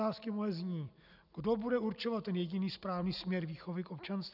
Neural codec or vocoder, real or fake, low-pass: none; real; 5.4 kHz